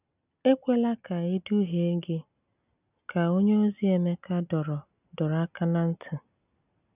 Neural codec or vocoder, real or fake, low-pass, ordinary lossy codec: none; real; 3.6 kHz; none